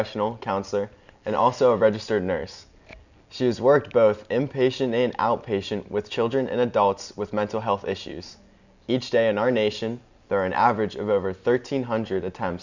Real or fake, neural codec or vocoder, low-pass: real; none; 7.2 kHz